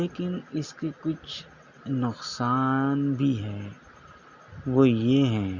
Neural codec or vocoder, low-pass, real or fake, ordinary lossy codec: none; 7.2 kHz; real; Opus, 64 kbps